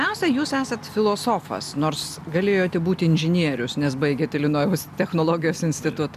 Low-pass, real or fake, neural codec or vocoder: 14.4 kHz; real; none